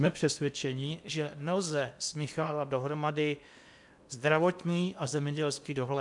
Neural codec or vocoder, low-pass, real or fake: codec, 16 kHz in and 24 kHz out, 0.8 kbps, FocalCodec, streaming, 65536 codes; 10.8 kHz; fake